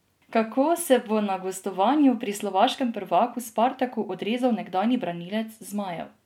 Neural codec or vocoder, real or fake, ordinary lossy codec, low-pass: none; real; MP3, 96 kbps; 19.8 kHz